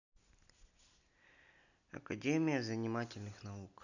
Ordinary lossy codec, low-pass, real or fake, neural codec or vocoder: none; 7.2 kHz; real; none